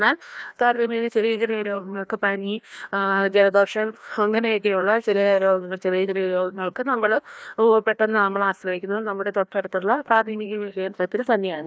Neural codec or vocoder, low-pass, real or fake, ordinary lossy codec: codec, 16 kHz, 1 kbps, FreqCodec, larger model; none; fake; none